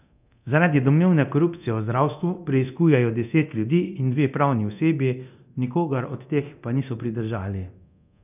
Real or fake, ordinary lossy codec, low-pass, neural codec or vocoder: fake; none; 3.6 kHz; codec, 24 kHz, 0.9 kbps, DualCodec